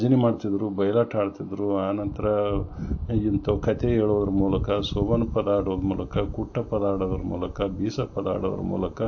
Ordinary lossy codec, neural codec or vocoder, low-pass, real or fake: AAC, 48 kbps; none; 7.2 kHz; real